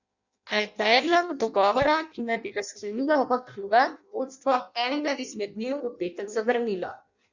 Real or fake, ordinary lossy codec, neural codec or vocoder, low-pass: fake; Opus, 64 kbps; codec, 16 kHz in and 24 kHz out, 0.6 kbps, FireRedTTS-2 codec; 7.2 kHz